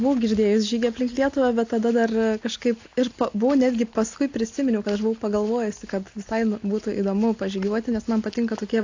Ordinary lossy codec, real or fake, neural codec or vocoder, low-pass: AAC, 48 kbps; real; none; 7.2 kHz